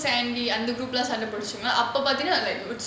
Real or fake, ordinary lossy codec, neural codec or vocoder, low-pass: real; none; none; none